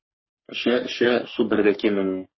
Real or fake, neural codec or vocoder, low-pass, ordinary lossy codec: fake; codec, 44.1 kHz, 3.4 kbps, Pupu-Codec; 7.2 kHz; MP3, 24 kbps